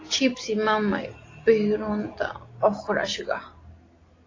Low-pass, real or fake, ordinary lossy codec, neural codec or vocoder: 7.2 kHz; real; AAC, 32 kbps; none